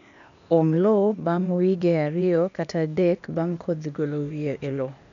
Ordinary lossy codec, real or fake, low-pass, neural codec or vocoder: none; fake; 7.2 kHz; codec, 16 kHz, 0.8 kbps, ZipCodec